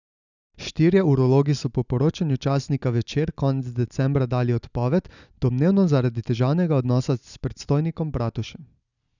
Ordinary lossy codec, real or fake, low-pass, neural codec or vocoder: none; real; 7.2 kHz; none